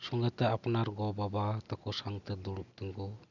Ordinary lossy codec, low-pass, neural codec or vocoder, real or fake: none; 7.2 kHz; none; real